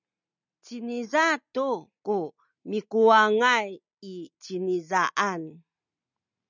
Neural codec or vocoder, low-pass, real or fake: none; 7.2 kHz; real